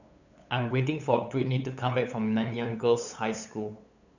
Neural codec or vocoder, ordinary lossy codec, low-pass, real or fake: codec, 16 kHz, 8 kbps, FunCodec, trained on LibriTTS, 25 frames a second; none; 7.2 kHz; fake